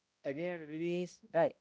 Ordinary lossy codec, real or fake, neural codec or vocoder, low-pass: none; fake; codec, 16 kHz, 0.5 kbps, X-Codec, HuBERT features, trained on balanced general audio; none